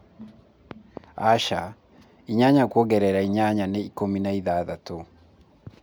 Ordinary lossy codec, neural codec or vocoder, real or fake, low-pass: none; none; real; none